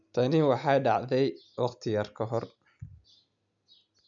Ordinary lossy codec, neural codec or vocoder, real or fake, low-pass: none; none; real; 7.2 kHz